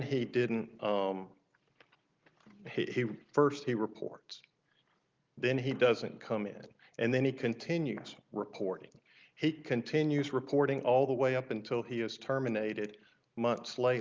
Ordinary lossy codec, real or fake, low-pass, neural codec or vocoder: Opus, 24 kbps; real; 7.2 kHz; none